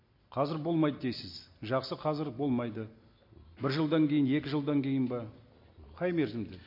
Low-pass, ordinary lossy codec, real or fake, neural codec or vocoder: 5.4 kHz; MP3, 32 kbps; real; none